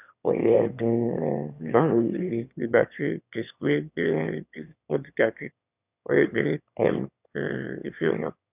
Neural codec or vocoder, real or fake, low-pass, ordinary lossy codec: autoencoder, 22.05 kHz, a latent of 192 numbers a frame, VITS, trained on one speaker; fake; 3.6 kHz; none